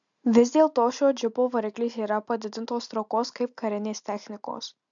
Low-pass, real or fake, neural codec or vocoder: 7.2 kHz; real; none